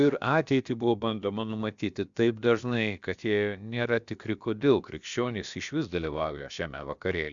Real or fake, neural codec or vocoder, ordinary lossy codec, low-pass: fake; codec, 16 kHz, about 1 kbps, DyCAST, with the encoder's durations; Opus, 64 kbps; 7.2 kHz